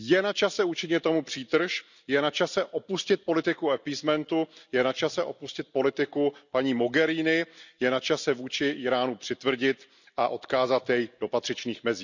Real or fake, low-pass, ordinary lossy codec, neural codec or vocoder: real; 7.2 kHz; none; none